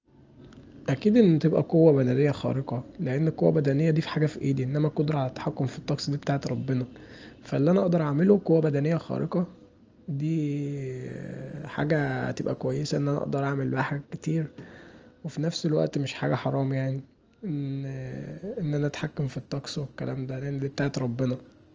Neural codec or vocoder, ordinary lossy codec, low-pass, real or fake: none; Opus, 24 kbps; 7.2 kHz; real